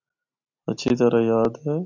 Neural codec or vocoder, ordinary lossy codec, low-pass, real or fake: none; AAC, 48 kbps; 7.2 kHz; real